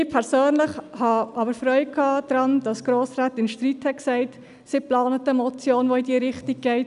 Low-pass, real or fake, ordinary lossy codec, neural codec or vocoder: 10.8 kHz; real; none; none